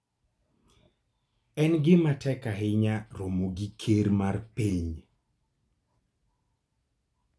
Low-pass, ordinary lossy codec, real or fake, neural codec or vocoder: none; none; real; none